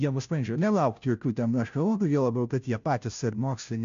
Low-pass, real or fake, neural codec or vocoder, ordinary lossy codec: 7.2 kHz; fake; codec, 16 kHz, 0.5 kbps, FunCodec, trained on Chinese and English, 25 frames a second; MP3, 64 kbps